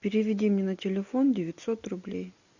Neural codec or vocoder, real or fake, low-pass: none; real; 7.2 kHz